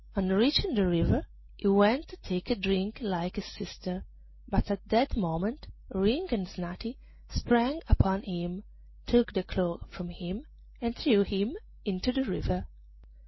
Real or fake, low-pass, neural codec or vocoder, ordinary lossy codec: real; 7.2 kHz; none; MP3, 24 kbps